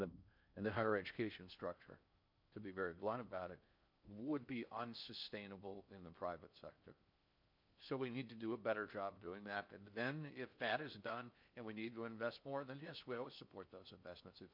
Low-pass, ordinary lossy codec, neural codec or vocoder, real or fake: 5.4 kHz; MP3, 48 kbps; codec, 16 kHz in and 24 kHz out, 0.6 kbps, FocalCodec, streaming, 4096 codes; fake